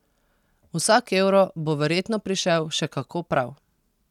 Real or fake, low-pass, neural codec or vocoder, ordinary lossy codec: real; 19.8 kHz; none; none